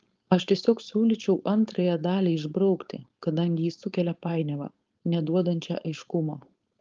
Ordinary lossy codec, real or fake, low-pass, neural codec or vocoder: Opus, 32 kbps; fake; 7.2 kHz; codec, 16 kHz, 4.8 kbps, FACodec